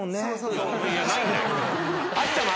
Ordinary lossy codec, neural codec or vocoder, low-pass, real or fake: none; none; none; real